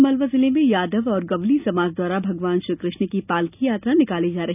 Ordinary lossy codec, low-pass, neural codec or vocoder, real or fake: none; 3.6 kHz; none; real